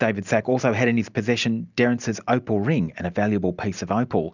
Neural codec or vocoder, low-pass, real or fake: none; 7.2 kHz; real